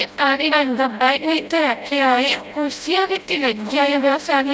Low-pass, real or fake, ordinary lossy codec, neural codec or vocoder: none; fake; none; codec, 16 kHz, 0.5 kbps, FreqCodec, smaller model